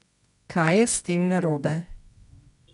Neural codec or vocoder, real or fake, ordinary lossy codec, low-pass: codec, 24 kHz, 0.9 kbps, WavTokenizer, medium music audio release; fake; none; 10.8 kHz